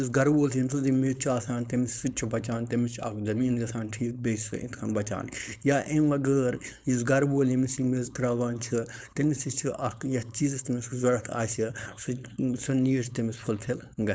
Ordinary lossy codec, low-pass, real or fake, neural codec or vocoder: none; none; fake; codec, 16 kHz, 4.8 kbps, FACodec